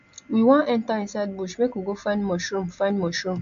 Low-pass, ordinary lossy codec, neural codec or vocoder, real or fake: 7.2 kHz; AAC, 64 kbps; none; real